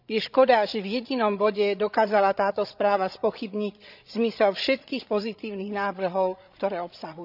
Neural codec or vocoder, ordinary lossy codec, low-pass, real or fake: codec, 16 kHz, 16 kbps, FreqCodec, larger model; none; 5.4 kHz; fake